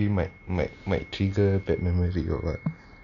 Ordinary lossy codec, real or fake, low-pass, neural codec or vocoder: none; real; 7.2 kHz; none